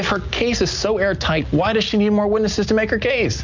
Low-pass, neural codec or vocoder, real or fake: 7.2 kHz; none; real